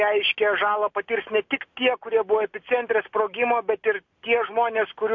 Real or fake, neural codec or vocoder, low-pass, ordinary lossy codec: real; none; 7.2 kHz; MP3, 48 kbps